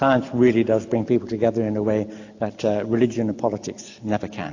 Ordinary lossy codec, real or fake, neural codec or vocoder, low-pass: AAC, 48 kbps; real; none; 7.2 kHz